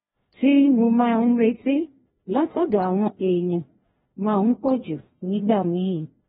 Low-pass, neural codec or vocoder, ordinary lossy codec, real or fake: 7.2 kHz; codec, 16 kHz, 1 kbps, FreqCodec, larger model; AAC, 16 kbps; fake